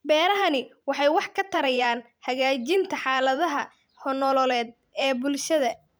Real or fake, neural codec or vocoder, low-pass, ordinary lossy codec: fake; vocoder, 44.1 kHz, 128 mel bands every 512 samples, BigVGAN v2; none; none